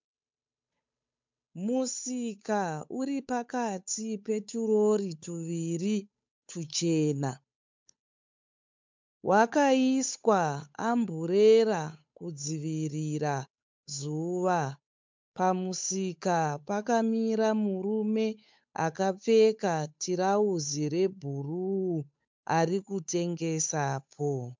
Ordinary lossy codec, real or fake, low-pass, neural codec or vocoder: MP3, 64 kbps; fake; 7.2 kHz; codec, 16 kHz, 8 kbps, FunCodec, trained on Chinese and English, 25 frames a second